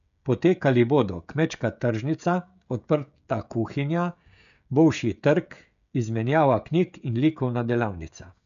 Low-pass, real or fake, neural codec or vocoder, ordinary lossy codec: 7.2 kHz; fake; codec, 16 kHz, 16 kbps, FreqCodec, smaller model; none